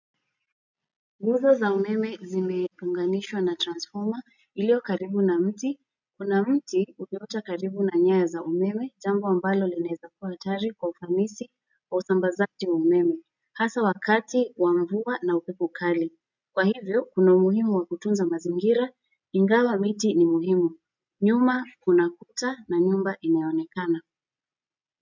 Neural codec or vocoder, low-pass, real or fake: none; 7.2 kHz; real